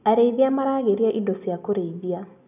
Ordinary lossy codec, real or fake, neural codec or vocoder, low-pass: none; real; none; 3.6 kHz